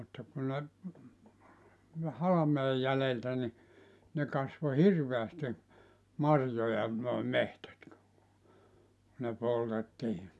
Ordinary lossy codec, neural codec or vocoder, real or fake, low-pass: none; none; real; none